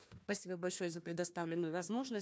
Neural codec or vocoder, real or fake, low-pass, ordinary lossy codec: codec, 16 kHz, 1 kbps, FunCodec, trained on Chinese and English, 50 frames a second; fake; none; none